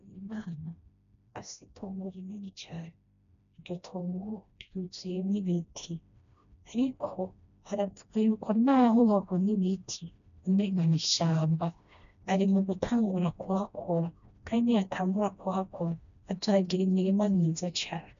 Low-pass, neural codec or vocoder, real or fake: 7.2 kHz; codec, 16 kHz, 1 kbps, FreqCodec, smaller model; fake